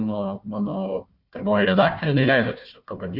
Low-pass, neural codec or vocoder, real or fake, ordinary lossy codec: 5.4 kHz; codec, 16 kHz, 1 kbps, FunCodec, trained on Chinese and English, 50 frames a second; fake; Opus, 64 kbps